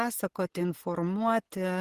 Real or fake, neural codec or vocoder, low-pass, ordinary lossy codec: real; none; 14.4 kHz; Opus, 16 kbps